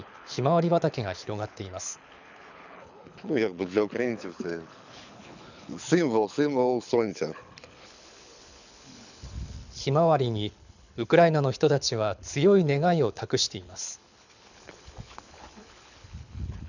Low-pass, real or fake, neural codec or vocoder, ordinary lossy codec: 7.2 kHz; fake; codec, 24 kHz, 6 kbps, HILCodec; none